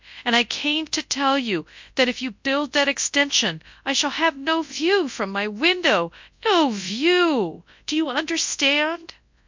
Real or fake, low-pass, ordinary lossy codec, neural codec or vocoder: fake; 7.2 kHz; MP3, 64 kbps; codec, 24 kHz, 0.9 kbps, WavTokenizer, large speech release